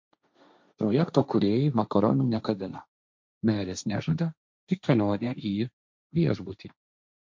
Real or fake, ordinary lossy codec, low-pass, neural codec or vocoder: fake; MP3, 64 kbps; 7.2 kHz; codec, 16 kHz, 1.1 kbps, Voila-Tokenizer